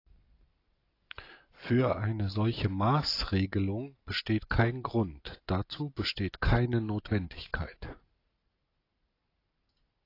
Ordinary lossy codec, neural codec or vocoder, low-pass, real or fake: AAC, 32 kbps; none; 5.4 kHz; real